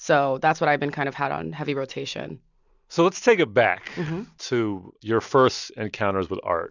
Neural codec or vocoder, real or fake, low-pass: none; real; 7.2 kHz